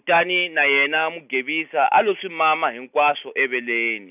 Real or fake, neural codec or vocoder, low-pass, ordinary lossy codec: real; none; 3.6 kHz; none